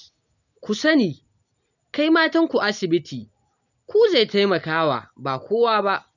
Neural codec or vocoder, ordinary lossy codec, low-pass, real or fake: none; none; 7.2 kHz; real